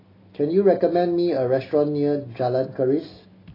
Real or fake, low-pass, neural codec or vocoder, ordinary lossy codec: real; 5.4 kHz; none; AAC, 24 kbps